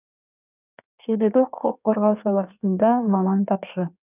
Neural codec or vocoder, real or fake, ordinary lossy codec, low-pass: codec, 16 kHz in and 24 kHz out, 1.1 kbps, FireRedTTS-2 codec; fake; none; 3.6 kHz